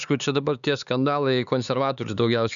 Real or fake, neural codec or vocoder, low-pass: fake; codec, 16 kHz, 4 kbps, X-Codec, HuBERT features, trained on LibriSpeech; 7.2 kHz